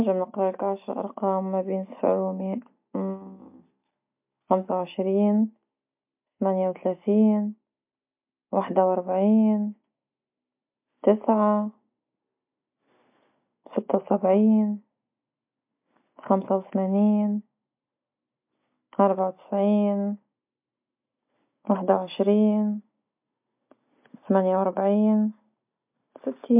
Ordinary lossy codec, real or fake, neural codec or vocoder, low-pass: MP3, 32 kbps; real; none; 3.6 kHz